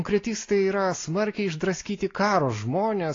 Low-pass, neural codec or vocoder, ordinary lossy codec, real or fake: 7.2 kHz; none; AAC, 32 kbps; real